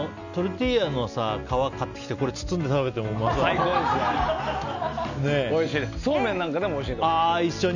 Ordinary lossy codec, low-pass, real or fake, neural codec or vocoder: none; 7.2 kHz; real; none